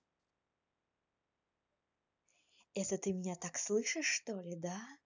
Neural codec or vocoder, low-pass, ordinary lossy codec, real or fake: none; 7.2 kHz; none; real